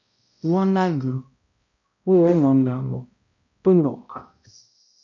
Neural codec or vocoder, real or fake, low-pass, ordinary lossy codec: codec, 16 kHz, 0.5 kbps, X-Codec, HuBERT features, trained on balanced general audio; fake; 7.2 kHz; MP3, 48 kbps